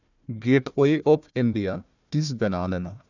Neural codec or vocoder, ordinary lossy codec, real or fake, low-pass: codec, 16 kHz, 1 kbps, FunCodec, trained on Chinese and English, 50 frames a second; none; fake; 7.2 kHz